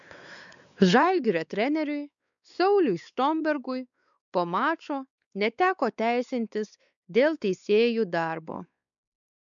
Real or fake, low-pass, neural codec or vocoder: fake; 7.2 kHz; codec, 16 kHz, 4 kbps, X-Codec, WavLM features, trained on Multilingual LibriSpeech